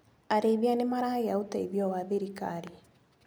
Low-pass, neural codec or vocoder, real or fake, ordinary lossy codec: none; none; real; none